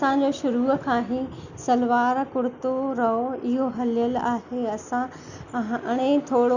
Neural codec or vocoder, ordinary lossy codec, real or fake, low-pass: none; none; real; 7.2 kHz